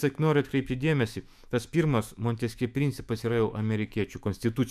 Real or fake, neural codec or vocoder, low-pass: fake; autoencoder, 48 kHz, 128 numbers a frame, DAC-VAE, trained on Japanese speech; 14.4 kHz